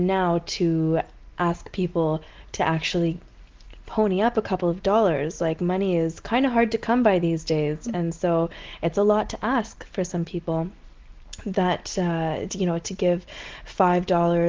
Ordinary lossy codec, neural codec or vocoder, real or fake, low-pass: Opus, 16 kbps; none; real; 7.2 kHz